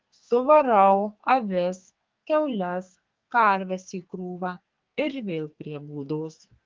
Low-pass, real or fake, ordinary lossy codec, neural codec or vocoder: 7.2 kHz; fake; Opus, 32 kbps; codec, 44.1 kHz, 2.6 kbps, SNAC